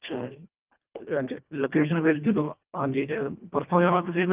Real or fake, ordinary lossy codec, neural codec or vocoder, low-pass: fake; Opus, 32 kbps; codec, 24 kHz, 1.5 kbps, HILCodec; 3.6 kHz